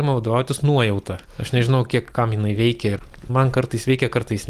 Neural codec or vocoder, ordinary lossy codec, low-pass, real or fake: none; Opus, 24 kbps; 14.4 kHz; real